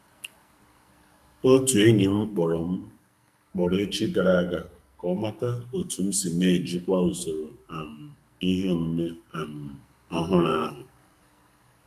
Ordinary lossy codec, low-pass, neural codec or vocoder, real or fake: none; 14.4 kHz; codec, 44.1 kHz, 2.6 kbps, SNAC; fake